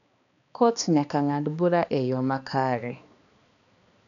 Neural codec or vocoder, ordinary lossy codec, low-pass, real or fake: codec, 16 kHz, 2 kbps, X-Codec, HuBERT features, trained on balanced general audio; none; 7.2 kHz; fake